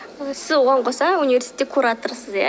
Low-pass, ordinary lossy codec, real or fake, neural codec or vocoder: none; none; real; none